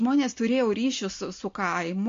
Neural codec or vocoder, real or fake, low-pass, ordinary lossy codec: none; real; 7.2 kHz; MP3, 48 kbps